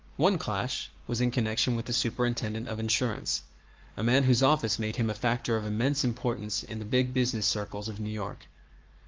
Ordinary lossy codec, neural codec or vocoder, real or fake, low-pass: Opus, 24 kbps; codec, 44.1 kHz, 7.8 kbps, Pupu-Codec; fake; 7.2 kHz